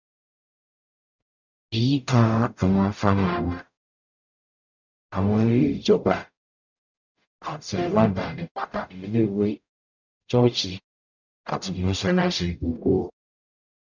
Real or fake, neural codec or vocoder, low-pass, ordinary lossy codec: fake; codec, 44.1 kHz, 0.9 kbps, DAC; 7.2 kHz; none